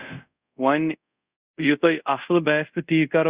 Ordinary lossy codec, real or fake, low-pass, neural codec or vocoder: Opus, 64 kbps; fake; 3.6 kHz; codec, 24 kHz, 0.5 kbps, DualCodec